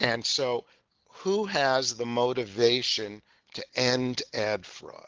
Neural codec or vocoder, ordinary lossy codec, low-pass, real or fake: none; Opus, 16 kbps; 7.2 kHz; real